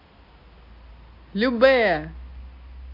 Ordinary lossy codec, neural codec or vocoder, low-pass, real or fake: AAC, 32 kbps; none; 5.4 kHz; real